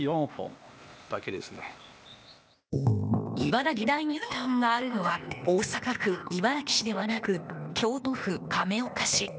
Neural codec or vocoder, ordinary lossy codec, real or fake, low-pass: codec, 16 kHz, 0.8 kbps, ZipCodec; none; fake; none